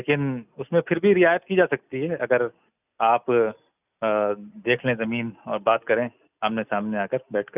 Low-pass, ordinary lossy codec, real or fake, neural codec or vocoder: 3.6 kHz; none; real; none